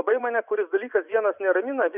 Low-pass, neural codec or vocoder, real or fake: 3.6 kHz; vocoder, 24 kHz, 100 mel bands, Vocos; fake